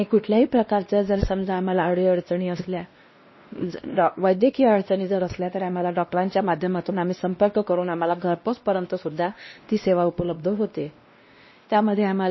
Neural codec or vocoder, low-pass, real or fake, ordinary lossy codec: codec, 16 kHz, 1 kbps, X-Codec, WavLM features, trained on Multilingual LibriSpeech; 7.2 kHz; fake; MP3, 24 kbps